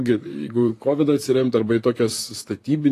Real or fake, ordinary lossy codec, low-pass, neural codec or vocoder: fake; AAC, 48 kbps; 14.4 kHz; vocoder, 44.1 kHz, 128 mel bands, Pupu-Vocoder